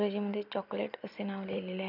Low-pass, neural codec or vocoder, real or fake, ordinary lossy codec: 5.4 kHz; vocoder, 44.1 kHz, 128 mel bands every 256 samples, BigVGAN v2; fake; none